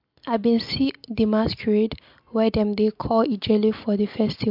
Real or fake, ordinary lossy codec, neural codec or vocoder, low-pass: real; MP3, 48 kbps; none; 5.4 kHz